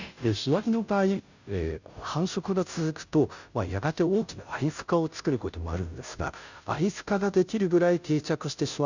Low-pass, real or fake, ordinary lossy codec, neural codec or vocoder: 7.2 kHz; fake; none; codec, 16 kHz, 0.5 kbps, FunCodec, trained on Chinese and English, 25 frames a second